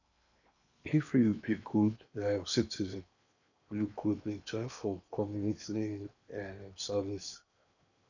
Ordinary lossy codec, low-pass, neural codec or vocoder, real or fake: none; 7.2 kHz; codec, 16 kHz in and 24 kHz out, 0.8 kbps, FocalCodec, streaming, 65536 codes; fake